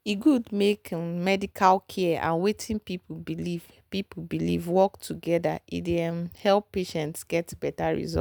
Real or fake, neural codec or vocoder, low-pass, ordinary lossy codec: real; none; none; none